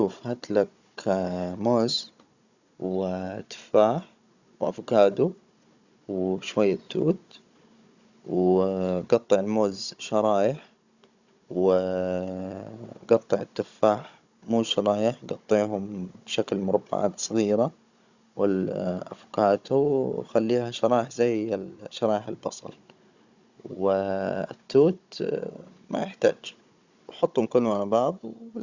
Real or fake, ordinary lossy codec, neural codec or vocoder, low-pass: fake; Opus, 64 kbps; codec, 16 kHz, 16 kbps, FunCodec, trained on Chinese and English, 50 frames a second; 7.2 kHz